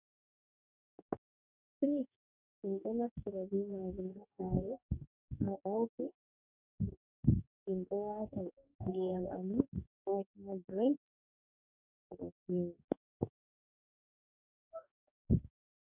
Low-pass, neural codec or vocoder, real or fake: 3.6 kHz; codec, 44.1 kHz, 2.6 kbps, DAC; fake